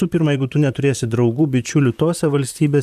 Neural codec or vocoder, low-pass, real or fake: vocoder, 44.1 kHz, 128 mel bands, Pupu-Vocoder; 14.4 kHz; fake